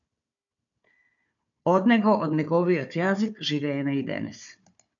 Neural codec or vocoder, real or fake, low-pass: codec, 16 kHz, 4 kbps, FunCodec, trained on Chinese and English, 50 frames a second; fake; 7.2 kHz